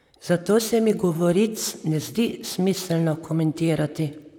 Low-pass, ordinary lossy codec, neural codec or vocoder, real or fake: 19.8 kHz; none; vocoder, 44.1 kHz, 128 mel bands, Pupu-Vocoder; fake